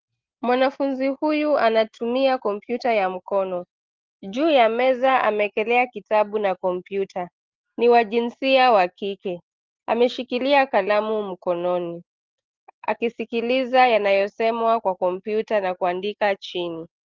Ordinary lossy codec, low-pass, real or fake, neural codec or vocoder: Opus, 16 kbps; 7.2 kHz; real; none